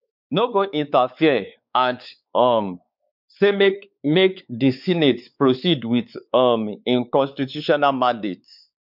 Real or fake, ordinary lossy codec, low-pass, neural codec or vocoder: fake; none; 5.4 kHz; codec, 16 kHz, 4 kbps, X-Codec, WavLM features, trained on Multilingual LibriSpeech